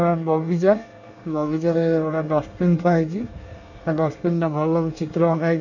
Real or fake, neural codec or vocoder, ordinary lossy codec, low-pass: fake; codec, 24 kHz, 1 kbps, SNAC; none; 7.2 kHz